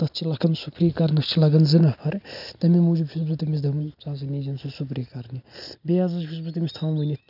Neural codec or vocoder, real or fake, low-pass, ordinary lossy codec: none; real; 5.4 kHz; none